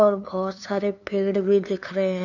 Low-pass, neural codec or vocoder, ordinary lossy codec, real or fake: 7.2 kHz; codec, 16 kHz, 2 kbps, FunCodec, trained on LibriTTS, 25 frames a second; none; fake